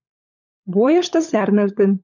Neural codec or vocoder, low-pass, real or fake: codec, 16 kHz, 16 kbps, FunCodec, trained on LibriTTS, 50 frames a second; 7.2 kHz; fake